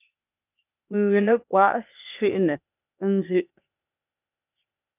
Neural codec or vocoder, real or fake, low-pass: codec, 16 kHz, 0.8 kbps, ZipCodec; fake; 3.6 kHz